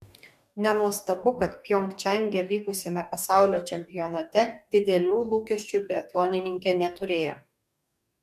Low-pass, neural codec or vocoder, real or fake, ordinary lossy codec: 14.4 kHz; codec, 44.1 kHz, 2.6 kbps, DAC; fake; MP3, 96 kbps